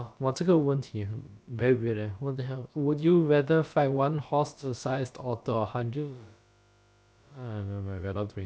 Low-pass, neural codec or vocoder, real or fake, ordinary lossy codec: none; codec, 16 kHz, about 1 kbps, DyCAST, with the encoder's durations; fake; none